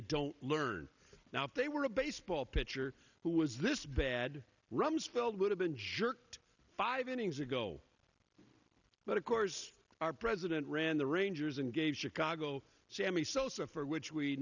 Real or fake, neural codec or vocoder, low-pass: real; none; 7.2 kHz